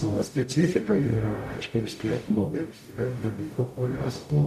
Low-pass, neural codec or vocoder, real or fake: 14.4 kHz; codec, 44.1 kHz, 0.9 kbps, DAC; fake